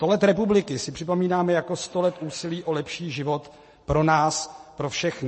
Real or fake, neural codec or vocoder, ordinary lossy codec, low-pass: fake; vocoder, 44.1 kHz, 128 mel bands every 512 samples, BigVGAN v2; MP3, 32 kbps; 10.8 kHz